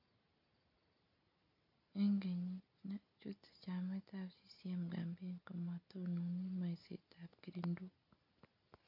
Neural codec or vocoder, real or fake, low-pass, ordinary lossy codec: none; real; 5.4 kHz; none